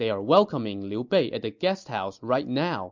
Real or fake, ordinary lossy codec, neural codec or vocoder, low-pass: real; MP3, 64 kbps; none; 7.2 kHz